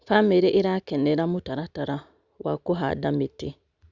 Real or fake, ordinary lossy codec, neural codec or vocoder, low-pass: real; Opus, 64 kbps; none; 7.2 kHz